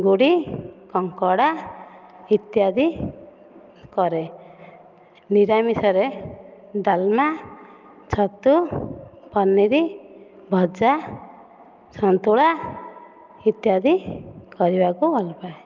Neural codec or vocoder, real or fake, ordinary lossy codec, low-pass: none; real; Opus, 24 kbps; 7.2 kHz